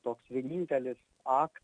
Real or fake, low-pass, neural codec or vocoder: real; 9.9 kHz; none